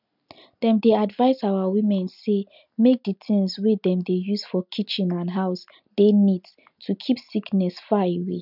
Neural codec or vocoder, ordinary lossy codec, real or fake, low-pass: none; none; real; 5.4 kHz